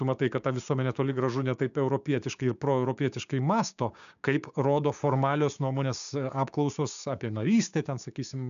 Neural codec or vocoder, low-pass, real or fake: codec, 16 kHz, 6 kbps, DAC; 7.2 kHz; fake